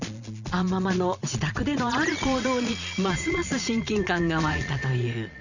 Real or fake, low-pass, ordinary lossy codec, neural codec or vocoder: fake; 7.2 kHz; none; vocoder, 22.05 kHz, 80 mel bands, Vocos